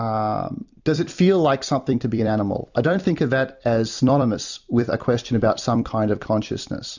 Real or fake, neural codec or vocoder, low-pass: fake; vocoder, 44.1 kHz, 128 mel bands every 256 samples, BigVGAN v2; 7.2 kHz